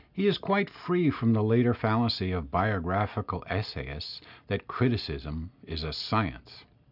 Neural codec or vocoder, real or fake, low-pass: none; real; 5.4 kHz